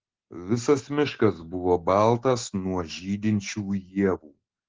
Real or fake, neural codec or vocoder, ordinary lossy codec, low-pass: real; none; Opus, 16 kbps; 7.2 kHz